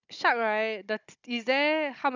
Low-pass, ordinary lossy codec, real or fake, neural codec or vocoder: 7.2 kHz; none; fake; codec, 16 kHz, 16 kbps, FunCodec, trained on Chinese and English, 50 frames a second